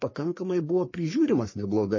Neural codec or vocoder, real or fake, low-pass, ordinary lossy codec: codec, 44.1 kHz, 3.4 kbps, Pupu-Codec; fake; 7.2 kHz; MP3, 32 kbps